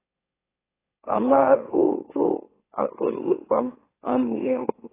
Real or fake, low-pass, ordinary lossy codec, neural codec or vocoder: fake; 3.6 kHz; AAC, 16 kbps; autoencoder, 44.1 kHz, a latent of 192 numbers a frame, MeloTTS